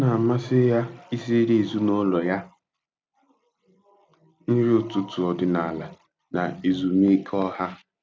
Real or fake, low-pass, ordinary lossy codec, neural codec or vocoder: real; none; none; none